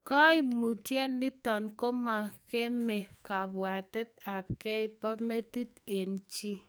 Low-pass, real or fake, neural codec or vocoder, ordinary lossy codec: none; fake; codec, 44.1 kHz, 2.6 kbps, SNAC; none